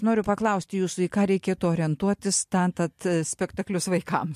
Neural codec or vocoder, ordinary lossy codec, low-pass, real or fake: none; MP3, 64 kbps; 14.4 kHz; real